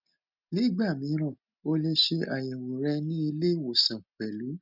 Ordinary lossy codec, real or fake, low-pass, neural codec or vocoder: none; real; 5.4 kHz; none